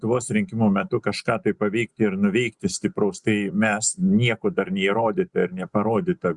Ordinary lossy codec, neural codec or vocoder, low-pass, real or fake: Opus, 64 kbps; none; 10.8 kHz; real